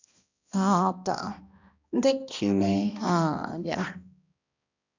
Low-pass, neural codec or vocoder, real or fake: 7.2 kHz; codec, 16 kHz, 1 kbps, X-Codec, HuBERT features, trained on balanced general audio; fake